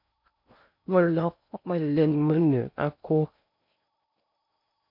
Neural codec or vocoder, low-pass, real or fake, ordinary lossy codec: codec, 16 kHz in and 24 kHz out, 0.6 kbps, FocalCodec, streaming, 4096 codes; 5.4 kHz; fake; MP3, 48 kbps